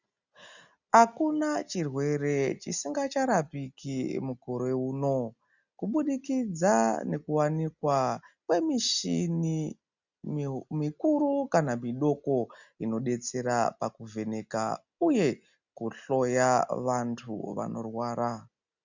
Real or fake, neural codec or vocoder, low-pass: real; none; 7.2 kHz